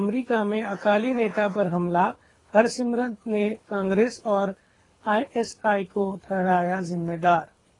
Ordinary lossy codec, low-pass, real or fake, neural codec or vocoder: AAC, 32 kbps; 10.8 kHz; fake; codec, 24 kHz, 3 kbps, HILCodec